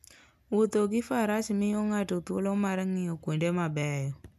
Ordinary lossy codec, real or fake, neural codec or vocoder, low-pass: none; real; none; 14.4 kHz